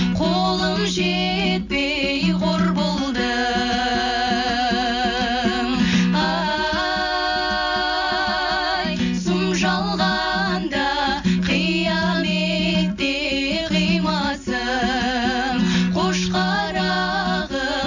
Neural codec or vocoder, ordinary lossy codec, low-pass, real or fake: none; none; 7.2 kHz; real